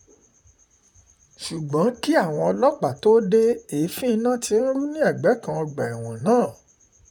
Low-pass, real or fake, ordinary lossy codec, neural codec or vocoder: 19.8 kHz; fake; none; vocoder, 44.1 kHz, 128 mel bands, Pupu-Vocoder